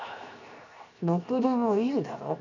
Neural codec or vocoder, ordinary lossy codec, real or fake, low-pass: codec, 16 kHz, 0.7 kbps, FocalCodec; none; fake; 7.2 kHz